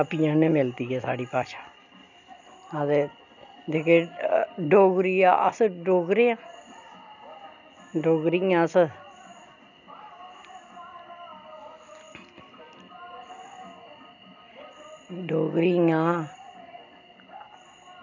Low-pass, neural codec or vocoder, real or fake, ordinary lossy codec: 7.2 kHz; none; real; none